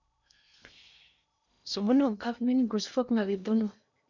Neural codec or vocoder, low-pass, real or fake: codec, 16 kHz in and 24 kHz out, 0.8 kbps, FocalCodec, streaming, 65536 codes; 7.2 kHz; fake